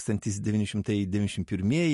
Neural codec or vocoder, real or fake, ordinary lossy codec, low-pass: none; real; MP3, 48 kbps; 14.4 kHz